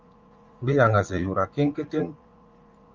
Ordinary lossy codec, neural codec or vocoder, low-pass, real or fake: Opus, 32 kbps; vocoder, 44.1 kHz, 80 mel bands, Vocos; 7.2 kHz; fake